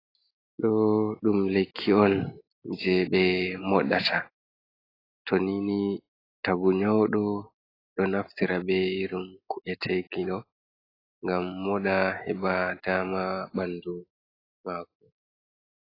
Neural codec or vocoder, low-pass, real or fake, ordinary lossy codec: none; 5.4 kHz; real; AAC, 24 kbps